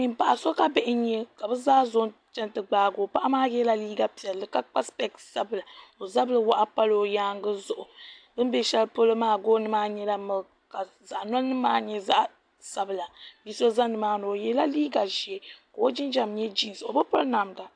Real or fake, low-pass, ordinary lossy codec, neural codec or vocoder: real; 9.9 kHz; AAC, 64 kbps; none